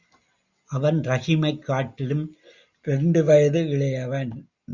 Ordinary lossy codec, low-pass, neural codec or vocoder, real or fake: Opus, 64 kbps; 7.2 kHz; none; real